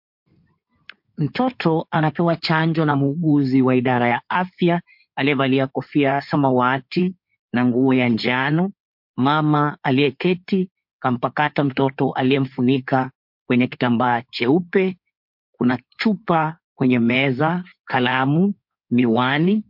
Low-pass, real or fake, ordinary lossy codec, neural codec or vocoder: 5.4 kHz; fake; MP3, 48 kbps; codec, 16 kHz in and 24 kHz out, 2.2 kbps, FireRedTTS-2 codec